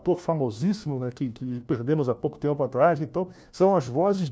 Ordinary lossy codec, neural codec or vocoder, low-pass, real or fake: none; codec, 16 kHz, 1 kbps, FunCodec, trained on LibriTTS, 50 frames a second; none; fake